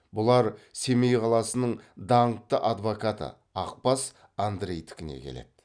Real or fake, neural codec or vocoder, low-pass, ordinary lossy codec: real; none; 9.9 kHz; none